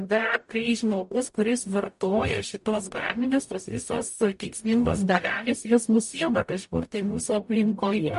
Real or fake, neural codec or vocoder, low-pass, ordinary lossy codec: fake; codec, 44.1 kHz, 0.9 kbps, DAC; 14.4 kHz; MP3, 48 kbps